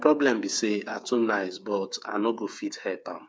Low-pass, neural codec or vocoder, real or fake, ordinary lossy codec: none; codec, 16 kHz, 8 kbps, FreqCodec, smaller model; fake; none